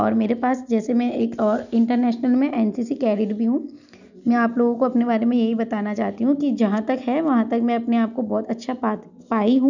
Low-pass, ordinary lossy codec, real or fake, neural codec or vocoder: 7.2 kHz; none; real; none